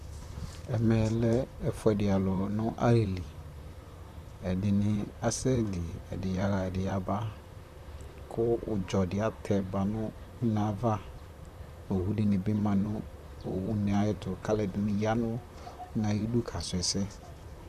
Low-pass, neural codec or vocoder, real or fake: 14.4 kHz; vocoder, 44.1 kHz, 128 mel bands, Pupu-Vocoder; fake